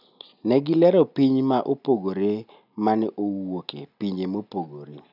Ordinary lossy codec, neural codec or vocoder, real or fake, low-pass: none; none; real; 5.4 kHz